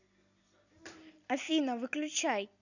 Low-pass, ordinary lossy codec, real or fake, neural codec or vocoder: 7.2 kHz; none; real; none